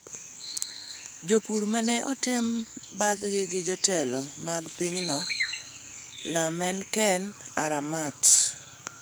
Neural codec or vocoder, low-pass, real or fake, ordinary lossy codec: codec, 44.1 kHz, 2.6 kbps, SNAC; none; fake; none